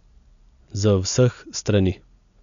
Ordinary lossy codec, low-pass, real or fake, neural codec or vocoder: none; 7.2 kHz; real; none